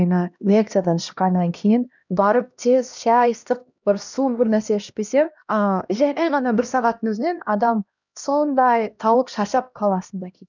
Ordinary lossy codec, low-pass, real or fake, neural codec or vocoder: none; 7.2 kHz; fake; codec, 16 kHz, 1 kbps, X-Codec, HuBERT features, trained on LibriSpeech